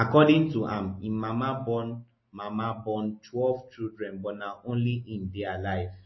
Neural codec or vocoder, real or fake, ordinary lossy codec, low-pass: none; real; MP3, 24 kbps; 7.2 kHz